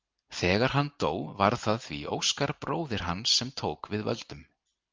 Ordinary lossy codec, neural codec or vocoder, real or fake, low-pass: Opus, 32 kbps; none; real; 7.2 kHz